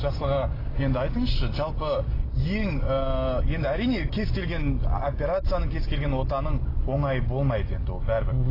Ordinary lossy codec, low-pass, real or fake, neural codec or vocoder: AAC, 24 kbps; 5.4 kHz; real; none